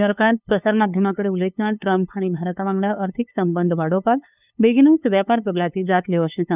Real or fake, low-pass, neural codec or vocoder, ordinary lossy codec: fake; 3.6 kHz; codec, 16 kHz, 2 kbps, FunCodec, trained on LibriTTS, 25 frames a second; none